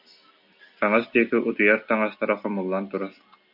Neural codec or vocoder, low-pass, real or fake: none; 5.4 kHz; real